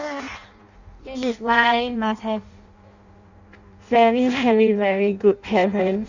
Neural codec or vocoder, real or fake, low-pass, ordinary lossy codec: codec, 16 kHz in and 24 kHz out, 0.6 kbps, FireRedTTS-2 codec; fake; 7.2 kHz; Opus, 64 kbps